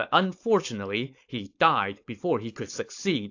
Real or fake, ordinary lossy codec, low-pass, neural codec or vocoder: fake; AAC, 48 kbps; 7.2 kHz; codec, 16 kHz, 4.8 kbps, FACodec